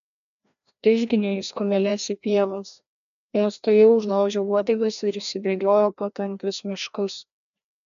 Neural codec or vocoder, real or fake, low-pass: codec, 16 kHz, 1 kbps, FreqCodec, larger model; fake; 7.2 kHz